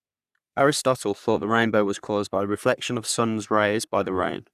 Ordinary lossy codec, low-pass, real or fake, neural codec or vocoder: none; 14.4 kHz; fake; codec, 44.1 kHz, 3.4 kbps, Pupu-Codec